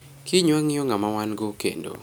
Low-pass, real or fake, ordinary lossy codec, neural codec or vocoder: none; real; none; none